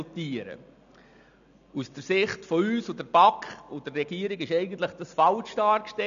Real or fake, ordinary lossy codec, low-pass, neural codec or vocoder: real; none; 7.2 kHz; none